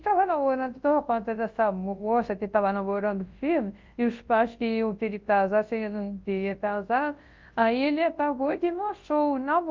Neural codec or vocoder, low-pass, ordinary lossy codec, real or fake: codec, 24 kHz, 0.9 kbps, WavTokenizer, large speech release; 7.2 kHz; Opus, 32 kbps; fake